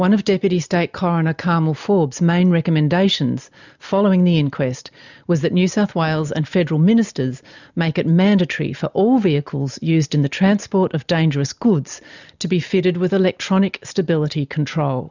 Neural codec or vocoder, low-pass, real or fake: none; 7.2 kHz; real